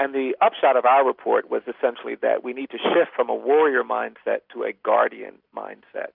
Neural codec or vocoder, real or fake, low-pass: none; real; 5.4 kHz